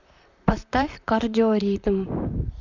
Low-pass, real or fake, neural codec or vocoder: 7.2 kHz; fake; vocoder, 44.1 kHz, 128 mel bands, Pupu-Vocoder